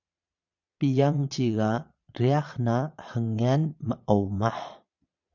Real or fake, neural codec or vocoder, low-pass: fake; vocoder, 22.05 kHz, 80 mel bands, Vocos; 7.2 kHz